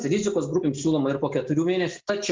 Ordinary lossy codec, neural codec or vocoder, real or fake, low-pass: Opus, 32 kbps; none; real; 7.2 kHz